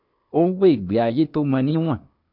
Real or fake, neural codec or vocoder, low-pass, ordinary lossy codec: fake; codec, 16 kHz, 0.8 kbps, ZipCodec; 5.4 kHz; none